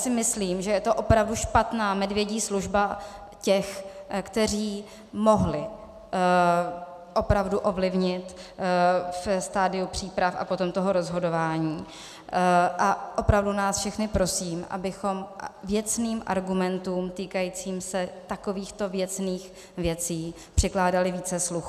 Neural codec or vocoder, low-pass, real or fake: none; 14.4 kHz; real